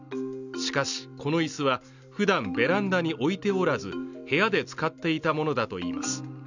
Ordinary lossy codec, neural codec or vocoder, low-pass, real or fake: none; none; 7.2 kHz; real